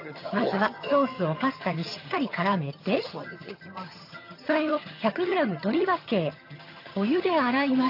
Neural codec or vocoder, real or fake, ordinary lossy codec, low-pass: vocoder, 22.05 kHz, 80 mel bands, HiFi-GAN; fake; AAC, 32 kbps; 5.4 kHz